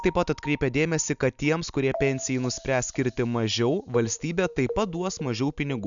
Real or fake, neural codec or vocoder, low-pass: real; none; 7.2 kHz